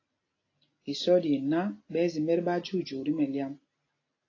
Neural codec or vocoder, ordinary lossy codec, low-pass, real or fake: none; AAC, 32 kbps; 7.2 kHz; real